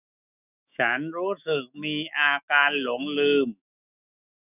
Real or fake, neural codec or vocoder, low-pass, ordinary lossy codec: fake; autoencoder, 48 kHz, 128 numbers a frame, DAC-VAE, trained on Japanese speech; 3.6 kHz; none